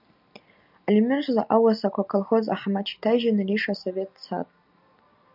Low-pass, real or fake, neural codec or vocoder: 5.4 kHz; real; none